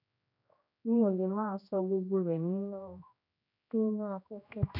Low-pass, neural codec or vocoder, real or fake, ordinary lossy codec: 5.4 kHz; codec, 16 kHz, 1 kbps, X-Codec, HuBERT features, trained on general audio; fake; none